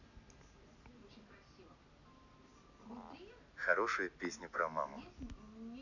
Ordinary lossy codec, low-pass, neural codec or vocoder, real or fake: none; 7.2 kHz; none; real